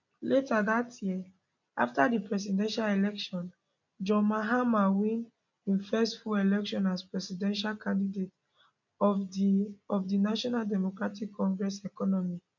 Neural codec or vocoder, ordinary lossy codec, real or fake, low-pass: none; none; real; 7.2 kHz